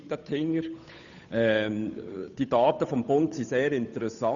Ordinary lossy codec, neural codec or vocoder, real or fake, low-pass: none; codec, 16 kHz, 8 kbps, FunCodec, trained on Chinese and English, 25 frames a second; fake; 7.2 kHz